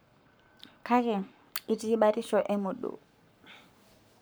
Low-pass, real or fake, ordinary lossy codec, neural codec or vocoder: none; fake; none; codec, 44.1 kHz, 7.8 kbps, Pupu-Codec